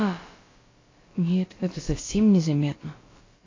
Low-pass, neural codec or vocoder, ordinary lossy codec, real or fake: 7.2 kHz; codec, 16 kHz, about 1 kbps, DyCAST, with the encoder's durations; AAC, 32 kbps; fake